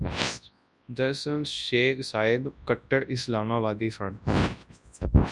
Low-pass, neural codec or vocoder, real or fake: 10.8 kHz; codec, 24 kHz, 0.9 kbps, WavTokenizer, large speech release; fake